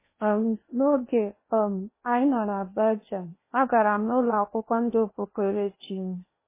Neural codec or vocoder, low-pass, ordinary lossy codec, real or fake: codec, 16 kHz in and 24 kHz out, 0.8 kbps, FocalCodec, streaming, 65536 codes; 3.6 kHz; MP3, 16 kbps; fake